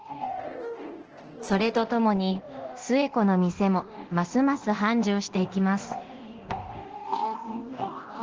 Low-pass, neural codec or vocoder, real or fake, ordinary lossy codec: 7.2 kHz; codec, 24 kHz, 0.9 kbps, DualCodec; fake; Opus, 16 kbps